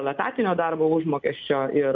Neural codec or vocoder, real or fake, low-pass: none; real; 7.2 kHz